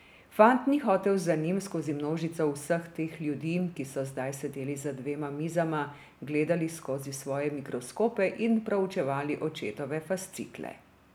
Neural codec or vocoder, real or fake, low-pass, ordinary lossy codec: none; real; none; none